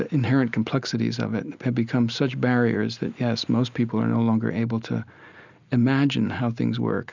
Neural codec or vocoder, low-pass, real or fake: none; 7.2 kHz; real